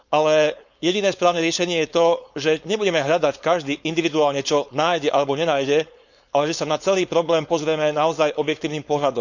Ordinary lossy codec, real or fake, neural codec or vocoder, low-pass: none; fake; codec, 16 kHz, 4.8 kbps, FACodec; 7.2 kHz